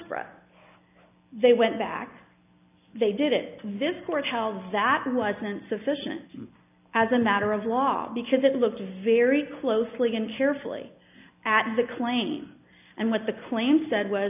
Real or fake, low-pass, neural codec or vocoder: real; 3.6 kHz; none